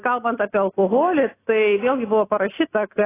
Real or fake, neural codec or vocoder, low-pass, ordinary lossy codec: real; none; 3.6 kHz; AAC, 16 kbps